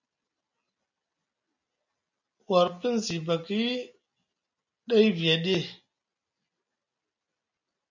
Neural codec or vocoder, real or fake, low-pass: none; real; 7.2 kHz